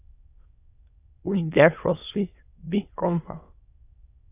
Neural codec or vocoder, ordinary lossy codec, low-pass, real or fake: autoencoder, 22.05 kHz, a latent of 192 numbers a frame, VITS, trained on many speakers; AAC, 32 kbps; 3.6 kHz; fake